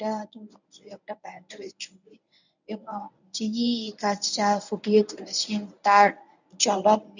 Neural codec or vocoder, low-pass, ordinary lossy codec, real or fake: codec, 24 kHz, 0.9 kbps, WavTokenizer, medium speech release version 1; 7.2 kHz; none; fake